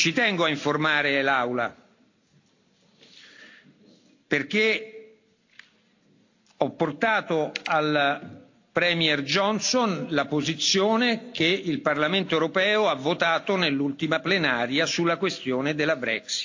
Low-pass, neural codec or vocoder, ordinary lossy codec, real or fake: 7.2 kHz; none; AAC, 48 kbps; real